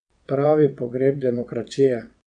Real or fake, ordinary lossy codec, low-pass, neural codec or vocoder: fake; none; 9.9 kHz; vocoder, 22.05 kHz, 80 mel bands, WaveNeXt